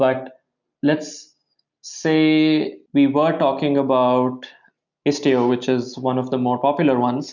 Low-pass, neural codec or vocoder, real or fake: 7.2 kHz; none; real